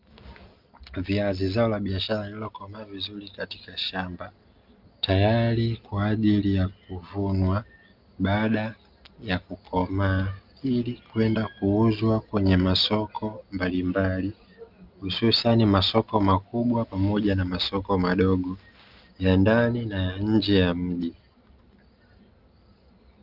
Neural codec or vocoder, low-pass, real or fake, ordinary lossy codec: none; 5.4 kHz; real; Opus, 24 kbps